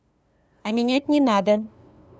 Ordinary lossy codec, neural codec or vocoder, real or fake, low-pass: none; codec, 16 kHz, 2 kbps, FunCodec, trained on LibriTTS, 25 frames a second; fake; none